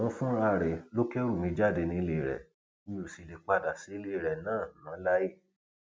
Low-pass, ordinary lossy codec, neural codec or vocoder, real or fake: none; none; none; real